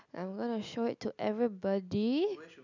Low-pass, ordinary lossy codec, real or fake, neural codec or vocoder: 7.2 kHz; none; real; none